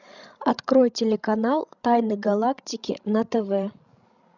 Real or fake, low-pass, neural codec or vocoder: fake; 7.2 kHz; codec, 16 kHz, 16 kbps, FreqCodec, larger model